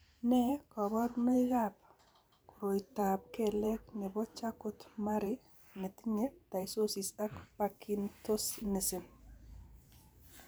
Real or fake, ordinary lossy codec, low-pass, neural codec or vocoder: fake; none; none; vocoder, 44.1 kHz, 128 mel bands every 512 samples, BigVGAN v2